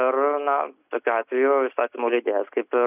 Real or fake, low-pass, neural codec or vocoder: real; 3.6 kHz; none